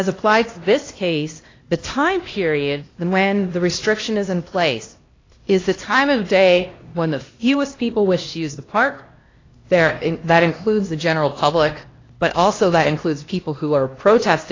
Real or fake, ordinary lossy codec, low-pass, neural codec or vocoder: fake; AAC, 32 kbps; 7.2 kHz; codec, 16 kHz, 1 kbps, X-Codec, HuBERT features, trained on LibriSpeech